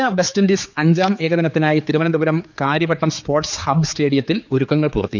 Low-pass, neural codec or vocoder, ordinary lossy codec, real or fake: 7.2 kHz; codec, 16 kHz, 4 kbps, X-Codec, HuBERT features, trained on general audio; none; fake